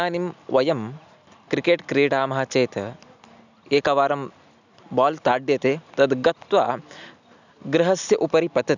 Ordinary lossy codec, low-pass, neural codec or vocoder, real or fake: none; 7.2 kHz; none; real